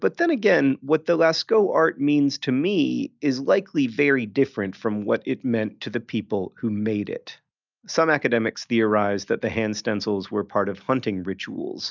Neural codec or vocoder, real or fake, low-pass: none; real; 7.2 kHz